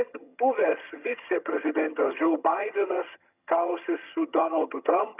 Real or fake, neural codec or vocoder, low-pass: fake; vocoder, 22.05 kHz, 80 mel bands, HiFi-GAN; 3.6 kHz